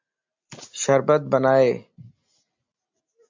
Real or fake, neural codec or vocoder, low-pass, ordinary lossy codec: real; none; 7.2 kHz; MP3, 64 kbps